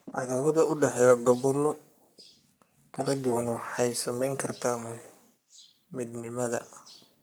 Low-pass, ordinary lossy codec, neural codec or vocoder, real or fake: none; none; codec, 44.1 kHz, 3.4 kbps, Pupu-Codec; fake